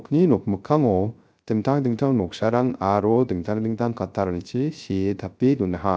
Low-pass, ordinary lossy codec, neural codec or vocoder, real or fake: none; none; codec, 16 kHz, 0.3 kbps, FocalCodec; fake